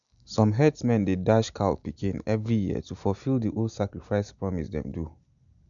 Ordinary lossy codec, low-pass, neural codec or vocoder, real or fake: none; 7.2 kHz; none; real